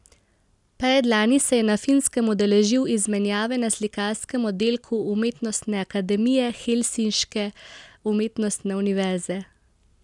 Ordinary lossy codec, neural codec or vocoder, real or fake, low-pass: none; none; real; 10.8 kHz